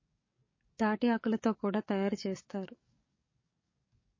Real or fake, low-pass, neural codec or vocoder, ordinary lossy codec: fake; 7.2 kHz; codec, 44.1 kHz, 7.8 kbps, DAC; MP3, 32 kbps